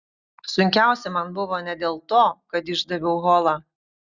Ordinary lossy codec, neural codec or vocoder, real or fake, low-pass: Opus, 64 kbps; none; real; 7.2 kHz